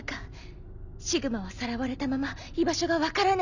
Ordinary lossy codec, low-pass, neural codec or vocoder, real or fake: none; 7.2 kHz; none; real